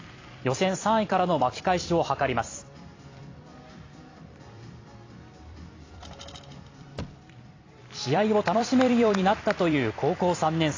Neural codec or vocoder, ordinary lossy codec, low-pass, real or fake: vocoder, 44.1 kHz, 128 mel bands every 256 samples, BigVGAN v2; AAC, 32 kbps; 7.2 kHz; fake